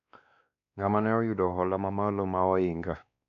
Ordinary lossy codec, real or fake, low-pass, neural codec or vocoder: MP3, 96 kbps; fake; 7.2 kHz; codec, 16 kHz, 2 kbps, X-Codec, WavLM features, trained on Multilingual LibriSpeech